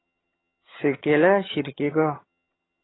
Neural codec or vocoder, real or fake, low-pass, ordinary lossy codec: vocoder, 22.05 kHz, 80 mel bands, HiFi-GAN; fake; 7.2 kHz; AAC, 16 kbps